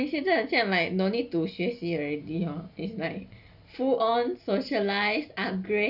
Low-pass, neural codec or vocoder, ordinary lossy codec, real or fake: 5.4 kHz; none; none; real